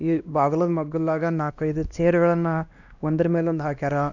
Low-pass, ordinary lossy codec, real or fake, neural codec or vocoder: 7.2 kHz; none; fake; codec, 16 kHz, 1 kbps, X-Codec, WavLM features, trained on Multilingual LibriSpeech